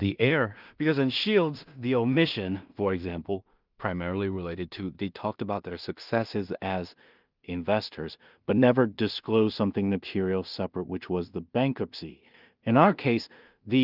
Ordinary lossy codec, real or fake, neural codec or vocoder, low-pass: Opus, 24 kbps; fake; codec, 16 kHz in and 24 kHz out, 0.4 kbps, LongCat-Audio-Codec, two codebook decoder; 5.4 kHz